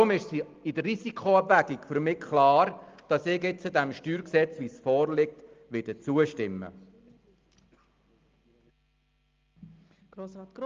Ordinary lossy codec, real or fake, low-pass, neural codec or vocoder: Opus, 32 kbps; real; 7.2 kHz; none